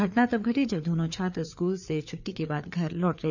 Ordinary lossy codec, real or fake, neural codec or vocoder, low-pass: none; fake; codec, 16 kHz, 4 kbps, FreqCodec, larger model; 7.2 kHz